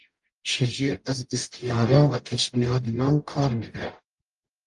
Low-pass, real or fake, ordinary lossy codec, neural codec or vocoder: 10.8 kHz; fake; Opus, 32 kbps; codec, 44.1 kHz, 0.9 kbps, DAC